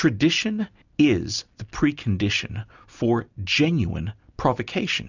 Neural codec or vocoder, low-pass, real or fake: none; 7.2 kHz; real